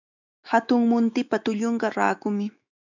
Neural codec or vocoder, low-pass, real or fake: autoencoder, 48 kHz, 128 numbers a frame, DAC-VAE, trained on Japanese speech; 7.2 kHz; fake